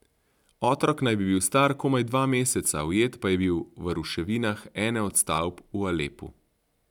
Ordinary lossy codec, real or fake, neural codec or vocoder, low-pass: none; fake; vocoder, 44.1 kHz, 128 mel bands every 256 samples, BigVGAN v2; 19.8 kHz